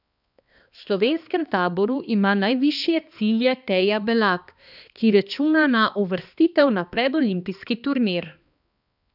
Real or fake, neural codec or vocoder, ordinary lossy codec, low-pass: fake; codec, 16 kHz, 2 kbps, X-Codec, HuBERT features, trained on balanced general audio; none; 5.4 kHz